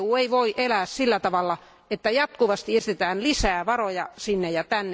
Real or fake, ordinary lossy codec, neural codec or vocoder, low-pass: real; none; none; none